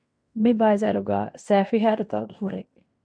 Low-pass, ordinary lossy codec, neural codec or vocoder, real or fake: 9.9 kHz; MP3, 64 kbps; codec, 16 kHz in and 24 kHz out, 0.9 kbps, LongCat-Audio-Codec, fine tuned four codebook decoder; fake